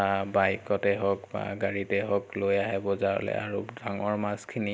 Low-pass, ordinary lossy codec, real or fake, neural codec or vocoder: none; none; real; none